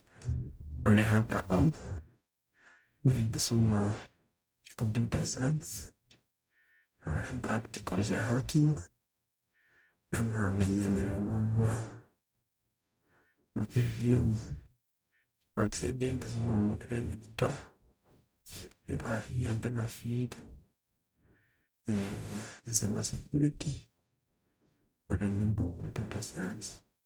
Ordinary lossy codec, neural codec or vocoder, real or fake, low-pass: none; codec, 44.1 kHz, 0.9 kbps, DAC; fake; none